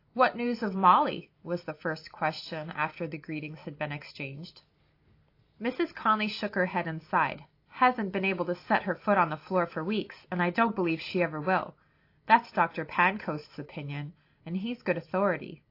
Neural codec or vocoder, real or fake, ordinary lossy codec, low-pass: none; real; AAC, 32 kbps; 5.4 kHz